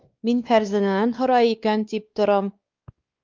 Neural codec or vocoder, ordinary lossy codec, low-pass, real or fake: codec, 16 kHz, 2 kbps, X-Codec, WavLM features, trained on Multilingual LibriSpeech; Opus, 24 kbps; 7.2 kHz; fake